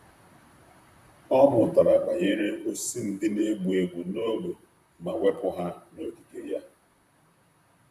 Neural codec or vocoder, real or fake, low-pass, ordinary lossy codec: vocoder, 44.1 kHz, 128 mel bands, Pupu-Vocoder; fake; 14.4 kHz; none